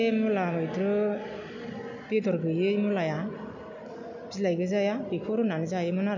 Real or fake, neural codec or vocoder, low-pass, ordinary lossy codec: real; none; 7.2 kHz; none